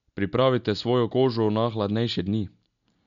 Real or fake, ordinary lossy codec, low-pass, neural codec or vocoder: real; none; 7.2 kHz; none